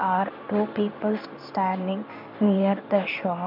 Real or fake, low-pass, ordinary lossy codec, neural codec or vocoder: fake; 5.4 kHz; MP3, 48 kbps; codec, 16 kHz in and 24 kHz out, 1 kbps, XY-Tokenizer